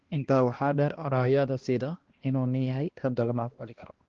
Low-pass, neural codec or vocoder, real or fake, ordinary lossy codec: 7.2 kHz; codec, 16 kHz, 1 kbps, X-Codec, HuBERT features, trained on balanced general audio; fake; Opus, 16 kbps